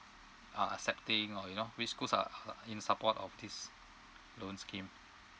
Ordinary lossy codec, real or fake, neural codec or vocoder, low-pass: none; real; none; none